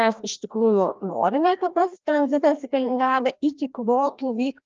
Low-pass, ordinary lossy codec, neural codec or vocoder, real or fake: 7.2 kHz; Opus, 24 kbps; codec, 16 kHz, 1 kbps, FreqCodec, larger model; fake